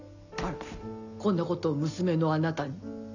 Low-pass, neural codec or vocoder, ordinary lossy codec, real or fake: 7.2 kHz; none; none; real